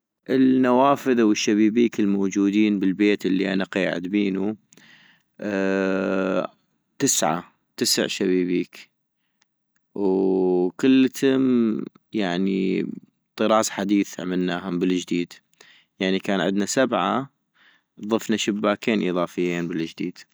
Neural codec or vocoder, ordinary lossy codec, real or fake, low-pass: none; none; real; none